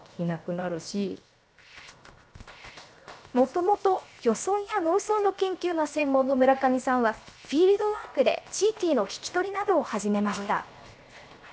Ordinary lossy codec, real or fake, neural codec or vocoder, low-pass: none; fake; codec, 16 kHz, 0.7 kbps, FocalCodec; none